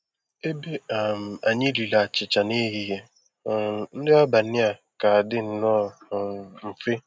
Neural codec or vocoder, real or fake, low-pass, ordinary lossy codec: none; real; none; none